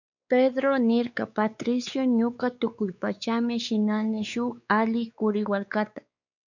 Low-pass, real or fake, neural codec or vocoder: 7.2 kHz; fake; codec, 16 kHz, 4 kbps, X-Codec, WavLM features, trained on Multilingual LibriSpeech